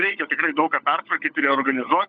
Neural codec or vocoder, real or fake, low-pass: codec, 16 kHz, 16 kbps, FunCodec, trained on LibriTTS, 50 frames a second; fake; 7.2 kHz